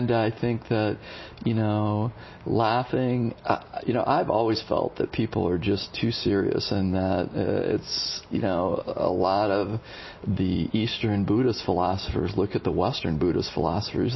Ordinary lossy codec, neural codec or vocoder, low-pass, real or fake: MP3, 24 kbps; none; 7.2 kHz; real